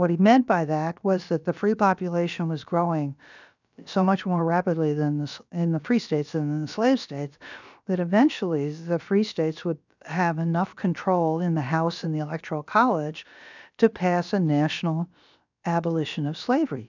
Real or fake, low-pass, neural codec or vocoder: fake; 7.2 kHz; codec, 16 kHz, about 1 kbps, DyCAST, with the encoder's durations